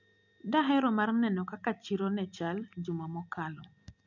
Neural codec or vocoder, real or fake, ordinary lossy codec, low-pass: none; real; none; 7.2 kHz